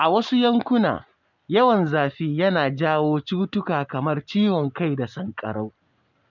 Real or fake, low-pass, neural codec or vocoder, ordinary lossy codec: real; 7.2 kHz; none; none